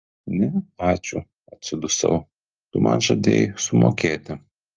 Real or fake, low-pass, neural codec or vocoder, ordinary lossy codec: real; 7.2 kHz; none; Opus, 32 kbps